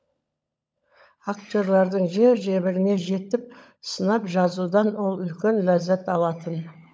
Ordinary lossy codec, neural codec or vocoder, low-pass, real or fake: none; codec, 16 kHz, 16 kbps, FunCodec, trained on LibriTTS, 50 frames a second; none; fake